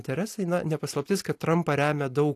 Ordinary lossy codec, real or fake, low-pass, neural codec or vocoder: AAC, 64 kbps; real; 14.4 kHz; none